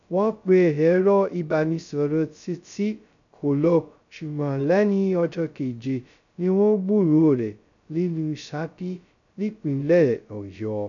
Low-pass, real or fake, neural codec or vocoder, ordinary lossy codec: 7.2 kHz; fake; codec, 16 kHz, 0.2 kbps, FocalCodec; none